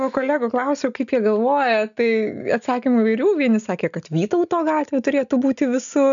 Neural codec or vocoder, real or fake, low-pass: none; real; 7.2 kHz